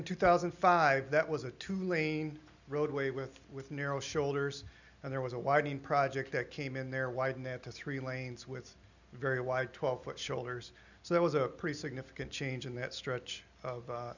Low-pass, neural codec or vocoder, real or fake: 7.2 kHz; none; real